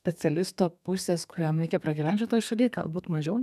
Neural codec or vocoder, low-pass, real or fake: codec, 32 kHz, 1.9 kbps, SNAC; 14.4 kHz; fake